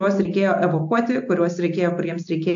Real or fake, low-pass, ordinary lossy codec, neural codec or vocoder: real; 7.2 kHz; AAC, 64 kbps; none